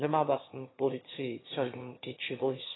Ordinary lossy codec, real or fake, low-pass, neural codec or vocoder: AAC, 16 kbps; fake; 7.2 kHz; autoencoder, 22.05 kHz, a latent of 192 numbers a frame, VITS, trained on one speaker